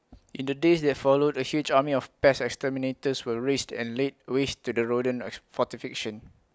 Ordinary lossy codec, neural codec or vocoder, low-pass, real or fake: none; none; none; real